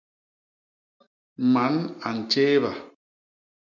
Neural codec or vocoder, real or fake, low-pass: none; real; 7.2 kHz